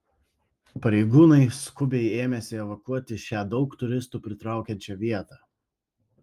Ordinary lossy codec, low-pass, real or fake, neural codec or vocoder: Opus, 32 kbps; 14.4 kHz; real; none